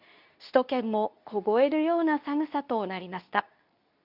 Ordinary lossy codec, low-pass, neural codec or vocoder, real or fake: none; 5.4 kHz; codec, 24 kHz, 0.9 kbps, WavTokenizer, medium speech release version 2; fake